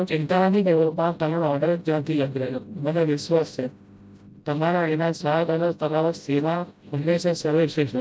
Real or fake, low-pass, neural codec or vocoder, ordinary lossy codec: fake; none; codec, 16 kHz, 0.5 kbps, FreqCodec, smaller model; none